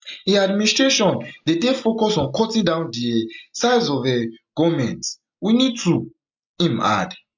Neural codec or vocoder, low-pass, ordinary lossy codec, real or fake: none; 7.2 kHz; MP3, 64 kbps; real